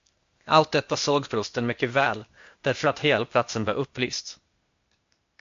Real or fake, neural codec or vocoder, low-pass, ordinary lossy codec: fake; codec, 16 kHz, 0.8 kbps, ZipCodec; 7.2 kHz; MP3, 48 kbps